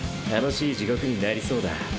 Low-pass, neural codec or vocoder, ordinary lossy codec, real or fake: none; none; none; real